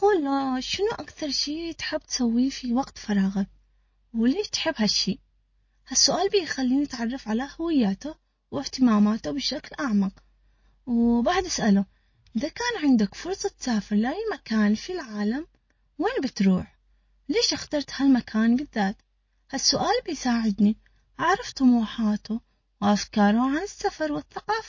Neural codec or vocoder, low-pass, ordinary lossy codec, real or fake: none; 7.2 kHz; MP3, 32 kbps; real